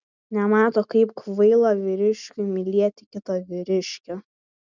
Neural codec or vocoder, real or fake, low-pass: none; real; 7.2 kHz